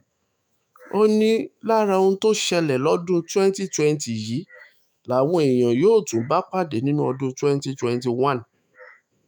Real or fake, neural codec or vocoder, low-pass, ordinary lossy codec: fake; autoencoder, 48 kHz, 128 numbers a frame, DAC-VAE, trained on Japanese speech; none; none